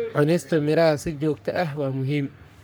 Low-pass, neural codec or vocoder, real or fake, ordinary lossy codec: none; codec, 44.1 kHz, 3.4 kbps, Pupu-Codec; fake; none